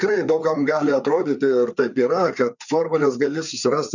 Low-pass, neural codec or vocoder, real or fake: 7.2 kHz; codec, 16 kHz, 4 kbps, FreqCodec, larger model; fake